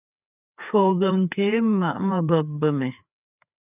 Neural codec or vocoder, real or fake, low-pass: codec, 16 kHz, 4 kbps, FreqCodec, larger model; fake; 3.6 kHz